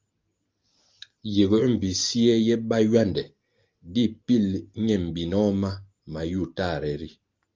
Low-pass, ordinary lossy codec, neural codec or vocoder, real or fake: 7.2 kHz; Opus, 32 kbps; none; real